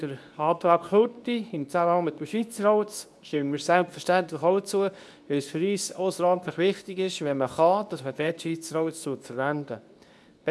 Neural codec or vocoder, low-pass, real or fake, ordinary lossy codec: codec, 24 kHz, 0.9 kbps, WavTokenizer, medium speech release version 2; none; fake; none